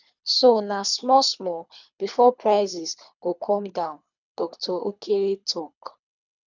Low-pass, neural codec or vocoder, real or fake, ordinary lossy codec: 7.2 kHz; codec, 24 kHz, 3 kbps, HILCodec; fake; none